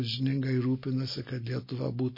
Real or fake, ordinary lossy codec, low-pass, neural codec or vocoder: real; MP3, 24 kbps; 5.4 kHz; none